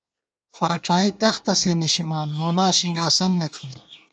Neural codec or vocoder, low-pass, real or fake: codec, 24 kHz, 1 kbps, SNAC; 9.9 kHz; fake